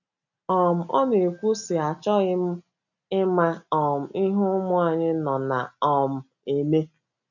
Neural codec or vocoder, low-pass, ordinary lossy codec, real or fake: none; 7.2 kHz; none; real